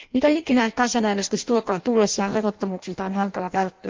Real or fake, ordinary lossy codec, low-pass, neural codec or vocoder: fake; Opus, 32 kbps; 7.2 kHz; codec, 16 kHz in and 24 kHz out, 0.6 kbps, FireRedTTS-2 codec